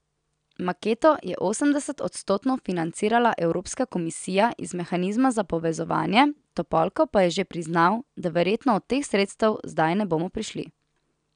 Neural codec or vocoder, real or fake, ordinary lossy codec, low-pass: none; real; none; 9.9 kHz